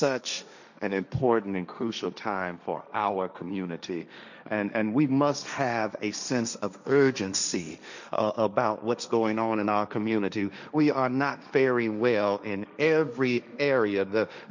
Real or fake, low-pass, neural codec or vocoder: fake; 7.2 kHz; codec, 16 kHz, 1.1 kbps, Voila-Tokenizer